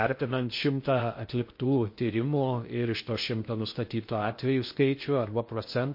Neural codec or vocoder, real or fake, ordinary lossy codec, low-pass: codec, 16 kHz in and 24 kHz out, 0.6 kbps, FocalCodec, streaming, 2048 codes; fake; MP3, 32 kbps; 5.4 kHz